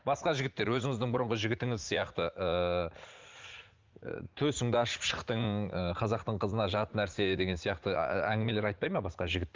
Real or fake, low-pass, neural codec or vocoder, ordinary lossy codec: fake; 7.2 kHz; vocoder, 44.1 kHz, 80 mel bands, Vocos; Opus, 24 kbps